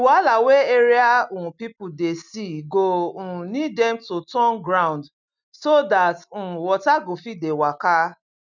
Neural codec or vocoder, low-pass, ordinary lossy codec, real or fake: none; 7.2 kHz; none; real